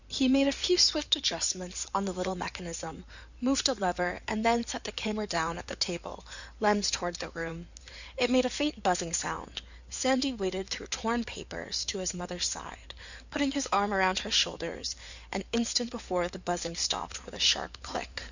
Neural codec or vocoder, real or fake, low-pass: codec, 16 kHz in and 24 kHz out, 2.2 kbps, FireRedTTS-2 codec; fake; 7.2 kHz